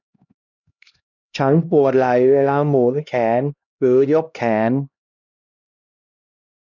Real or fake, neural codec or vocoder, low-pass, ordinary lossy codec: fake; codec, 16 kHz, 1 kbps, X-Codec, HuBERT features, trained on LibriSpeech; 7.2 kHz; none